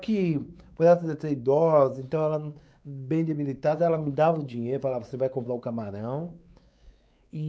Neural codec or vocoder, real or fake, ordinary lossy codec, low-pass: codec, 16 kHz, 4 kbps, X-Codec, WavLM features, trained on Multilingual LibriSpeech; fake; none; none